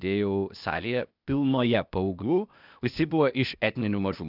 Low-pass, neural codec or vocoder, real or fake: 5.4 kHz; codec, 16 kHz, 0.8 kbps, ZipCodec; fake